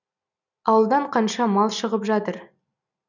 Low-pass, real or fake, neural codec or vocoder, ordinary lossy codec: 7.2 kHz; real; none; none